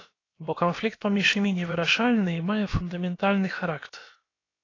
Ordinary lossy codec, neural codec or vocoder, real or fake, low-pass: AAC, 32 kbps; codec, 16 kHz, about 1 kbps, DyCAST, with the encoder's durations; fake; 7.2 kHz